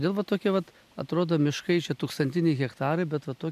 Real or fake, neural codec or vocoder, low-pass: real; none; 14.4 kHz